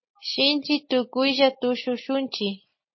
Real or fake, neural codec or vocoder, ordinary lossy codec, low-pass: real; none; MP3, 24 kbps; 7.2 kHz